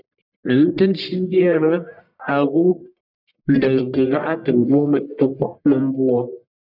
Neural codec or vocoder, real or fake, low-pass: codec, 44.1 kHz, 1.7 kbps, Pupu-Codec; fake; 5.4 kHz